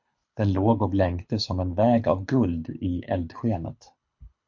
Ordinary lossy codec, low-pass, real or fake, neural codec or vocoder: MP3, 48 kbps; 7.2 kHz; fake; codec, 24 kHz, 6 kbps, HILCodec